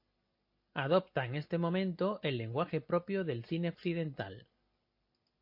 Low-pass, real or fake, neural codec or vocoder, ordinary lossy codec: 5.4 kHz; real; none; MP3, 32 kbps